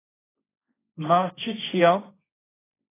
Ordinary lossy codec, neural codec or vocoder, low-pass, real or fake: AAC, 24 kbps; codec, 16 kHz, 1.1 kbps, Voila-Tokenizer; 3.6 kHz; fake